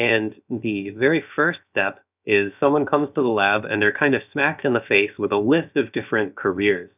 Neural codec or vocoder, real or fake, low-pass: codec, 16 kHz, about 1 kbps, DyCAST, with the encoder's durations; fake; 3.6 kHz